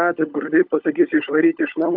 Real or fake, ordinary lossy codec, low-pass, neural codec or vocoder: fake; AAC, 48 kbps; 5.4 kHz; codec, 16 kHz, 16 kbps, FunCodec, trained on LibriTTS, 50 frames a second